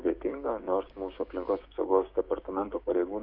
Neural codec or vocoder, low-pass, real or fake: codec, 16 kHz, 6 kbps, DAC; 5.4 kHz; fake